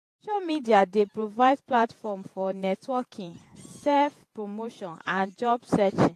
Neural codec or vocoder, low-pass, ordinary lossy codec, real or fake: vocoder, 48 kHz, 128 mel bands, Vocos; 14.4 kHz; AAC, 64 kbps; fake